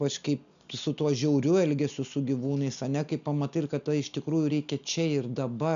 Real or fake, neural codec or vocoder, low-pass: real; none; 7.2 kHz